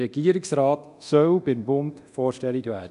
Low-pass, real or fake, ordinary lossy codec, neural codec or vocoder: 10.8 kHz; fake; none; codec, 24 kHz, 0.9 kbps, DualCodec